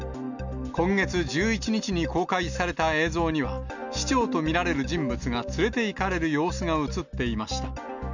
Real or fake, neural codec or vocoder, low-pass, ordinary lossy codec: real; none; 7.2 kHz; none